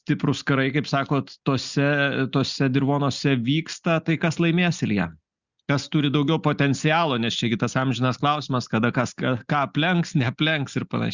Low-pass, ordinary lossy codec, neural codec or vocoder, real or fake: 7.2 kHz; Opus, 64 kbps; none; real